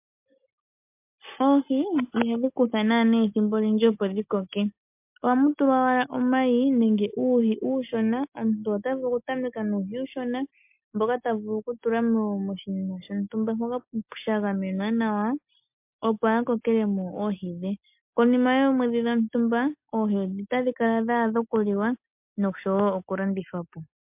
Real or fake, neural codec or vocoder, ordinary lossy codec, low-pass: real; none; MP3, 32 kbps; 3.6 kHz